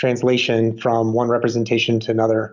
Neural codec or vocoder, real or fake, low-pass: none; real; 7.2 kHz